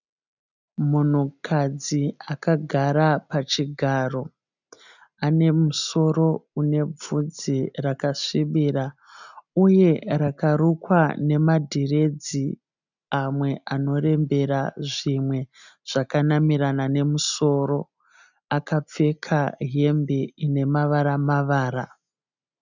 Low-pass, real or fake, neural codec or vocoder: 7.2 kHz; real; none